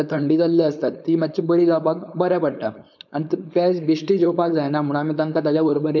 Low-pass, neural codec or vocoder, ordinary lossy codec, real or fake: 7.2 kHz; codec, 16 kHz, 4.8 kbps, FACodec; none; fake